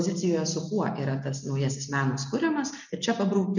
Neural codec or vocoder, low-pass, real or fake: none; 7.2 kHz; real